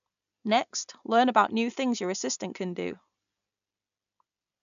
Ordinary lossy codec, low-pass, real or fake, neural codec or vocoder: none; 7.2 kHz; real; none